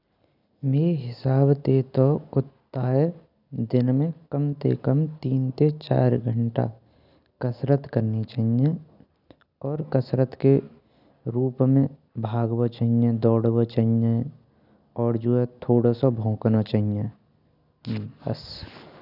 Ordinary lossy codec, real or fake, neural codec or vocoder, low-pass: none; real; none; 5.4 kHz